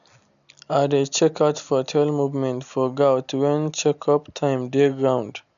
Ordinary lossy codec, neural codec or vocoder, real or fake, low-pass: none; none; real; 7.2 kHz